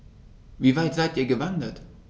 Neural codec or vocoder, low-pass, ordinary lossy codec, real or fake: none; none; none; real